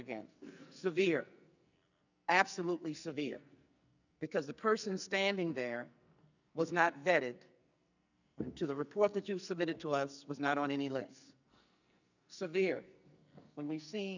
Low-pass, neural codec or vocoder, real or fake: 7.2 kHz; codec, 44.1 kHz, 2.6 kbps, SNAC; fake